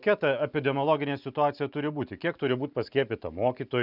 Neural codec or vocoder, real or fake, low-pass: none; real; 5.4 kHz